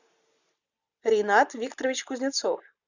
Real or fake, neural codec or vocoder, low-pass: real; none; 7.2 kHz